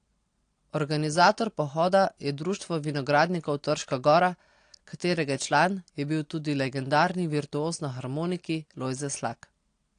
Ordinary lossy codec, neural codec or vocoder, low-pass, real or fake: AAC, 48 kbps; none; 9.9 kHz; real